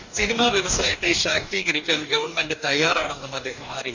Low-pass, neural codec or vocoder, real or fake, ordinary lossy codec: 7.2 kHz; codec, 44.1 kHz, 2.6 kbps, DAC; fake; none